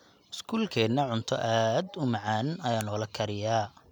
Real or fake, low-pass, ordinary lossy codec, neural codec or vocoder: real; 19.8 kHz; none; none